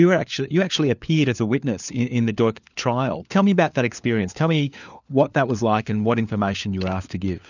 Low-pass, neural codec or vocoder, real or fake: 7.2 kHz; codec, 16 kHz, 4 kbps, FunCodec, trained on LibriTTS, 50 frames a second; fake